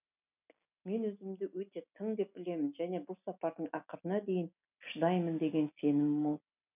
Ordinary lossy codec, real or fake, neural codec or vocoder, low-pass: none; real; none; 3.6 kHz